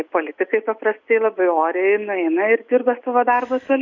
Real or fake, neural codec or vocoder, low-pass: real; none; 7.2 kHz